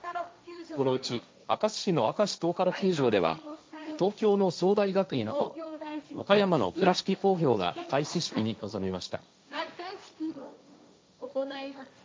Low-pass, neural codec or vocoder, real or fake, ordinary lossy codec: none; codec, 16 kHz, 1.1 kbps, Voila-Tokenizer; fake; none